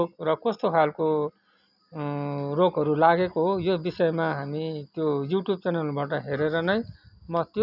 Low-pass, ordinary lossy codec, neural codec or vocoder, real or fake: 5.4 kHz; none; none; real